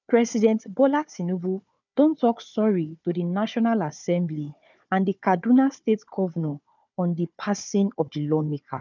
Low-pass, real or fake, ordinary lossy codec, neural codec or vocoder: 7.2 kHz; fake; none; codec, 16 kHz, 4 kbps, FunCodec, trained on Chinese and English, 50 frames a second